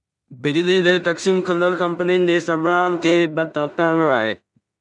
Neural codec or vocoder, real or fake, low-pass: codec, 16 kHz in and 24 kHz out, 0.4 kbps, LongCat-Audio-Codec, two codebook decoder; fake; 10.8 kHz